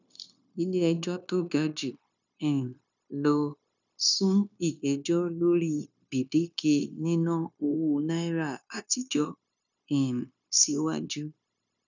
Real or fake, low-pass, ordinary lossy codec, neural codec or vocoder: fake; 7.2 kHz; none; codec, 16 kHz, 0.9 kbps, LongCat-Audio-Codec